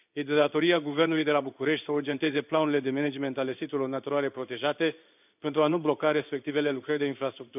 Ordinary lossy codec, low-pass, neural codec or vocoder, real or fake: none; 3.6 kHz; codec, 16 kHz in and 24 kHz out, 1 kbps, XY-Tokenizer; fake